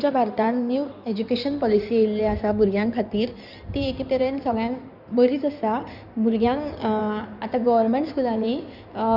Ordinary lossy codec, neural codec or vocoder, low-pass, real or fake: none; codec, 16 kHz in and 24 kHz out, 2.2 kbps, FireRedTTS-2 codec; 5.4 kHz; fake